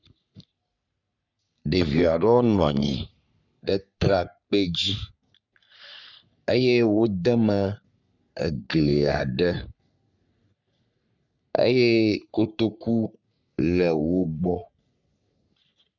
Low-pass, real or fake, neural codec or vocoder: 7.2 kHz; fake; codec, 44.1 kHz, 3.4 kbps, Pupu-Codec